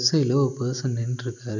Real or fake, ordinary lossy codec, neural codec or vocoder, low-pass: real; none; none; 7.2 kHz